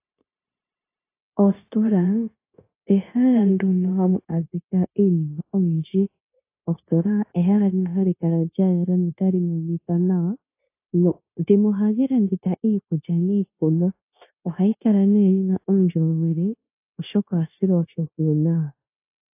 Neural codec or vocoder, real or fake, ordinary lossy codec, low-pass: codec, 16 kHz, 0.9 kbps, LongCat-Audio-Codec; fake; AAC, 24 kbps; 3.6 kHz